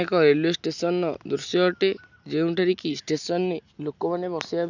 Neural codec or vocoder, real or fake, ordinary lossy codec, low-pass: none; real; none; 7.2 kHz